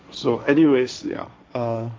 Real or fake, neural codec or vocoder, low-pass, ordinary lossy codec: fake; codec, 16 kHz, 1.1 kbps, Voila-Tokenizer; none; none